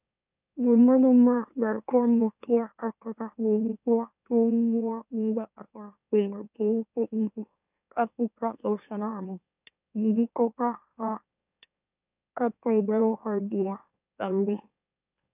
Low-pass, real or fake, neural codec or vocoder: 3.6 kHz; fake; autoencoder, 44.1 kHz, a latent of 192 numbers a frame, MeloTTS